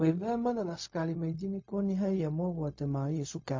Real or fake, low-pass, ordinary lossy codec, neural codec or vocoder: fake; 7.2 kHz; MP3, 32 kbps; codec, 16 kHz, 0.4 kbps, LongCat-Audio-Codec